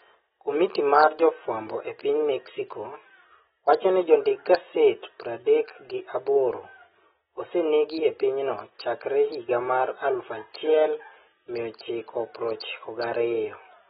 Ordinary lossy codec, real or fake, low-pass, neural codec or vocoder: AAC, 16 kbps; real; 7.2 kHz; none